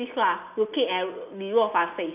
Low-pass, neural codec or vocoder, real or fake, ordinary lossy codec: 3.6 kHz; none; real; none